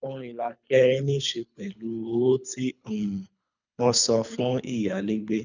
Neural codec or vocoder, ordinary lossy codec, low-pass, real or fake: codec, 24 kHz, 3 kbps, HILCodec; none; 7.2 kHz; fake